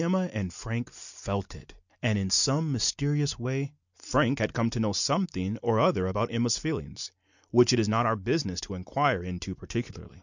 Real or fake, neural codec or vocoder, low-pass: real; none; 7.2 kHz